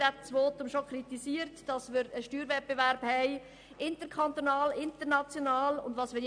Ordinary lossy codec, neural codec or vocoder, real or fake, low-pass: AAC, 64 kbps; none; real; 9.9 kHz